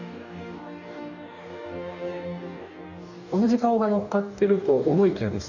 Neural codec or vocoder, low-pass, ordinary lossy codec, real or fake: codec, 44.1 kHz, 2.6 kbps, DAC; 7.2 kHz; none; fake